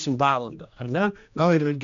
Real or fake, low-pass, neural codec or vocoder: fake; 7.2 kHz; codec, 16 kHz, 1 kbps, X-Codec, HuBERT features, trained on general audio